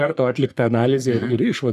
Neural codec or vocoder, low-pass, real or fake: codec, 44.1 kHz, 3.4 kbps, Pupu-Codec; 14.4 kHz; fake